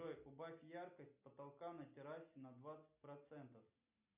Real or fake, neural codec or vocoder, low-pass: real; none; 3.6 kHz